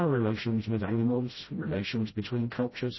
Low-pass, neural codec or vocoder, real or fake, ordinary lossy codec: 7.2 kHz; codec, 16 kHz, 0.5 kbps, FreqCodec, smaller model; fake; MP3, 24 kbps